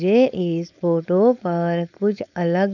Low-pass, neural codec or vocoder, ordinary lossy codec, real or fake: 7.2 kHz; codec, 16 kHz, 4.8 kbps, FACodec; none; fake